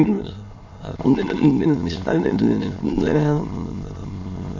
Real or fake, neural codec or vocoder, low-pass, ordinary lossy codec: fake; autoencoder, 22.05 kHz, a latent of 192 numbers a frame, VITS, trained on many speakers; 7.2 kHz; MP3, 48 kbps